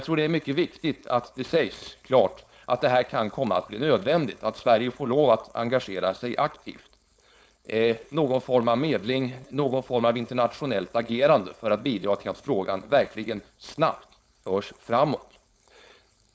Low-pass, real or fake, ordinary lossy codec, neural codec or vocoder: none; fake; none; codec, 16 kHz, 4.8 kbps, FACodec